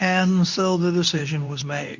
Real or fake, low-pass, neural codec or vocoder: fake; 7.2 kHz; codec, 24 kHz, 0.9 kbps, WavTokenizer, medium speech release version 2